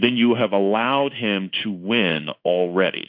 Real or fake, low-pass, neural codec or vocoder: fake; 5.4 kHz; codec, 16 kHz in and 24 kHz out, 1 kbps, XY-Tokenizer